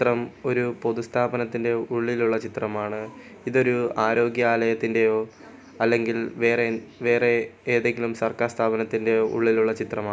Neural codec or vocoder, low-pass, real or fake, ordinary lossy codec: none; none; real; none